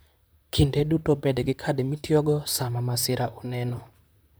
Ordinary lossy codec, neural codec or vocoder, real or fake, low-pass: none; vocoder, 44.1 kHz, 128 mel bands, Pupu-Vocoder; fake; none